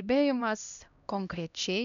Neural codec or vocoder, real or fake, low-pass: codec, 16 kHz, 1 kbps, X-Codec, HuBERT features, trained on LibriSpeech; fake; 7.2 kHz